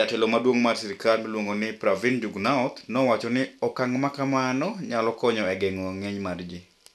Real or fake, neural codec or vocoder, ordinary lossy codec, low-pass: real; none; none; none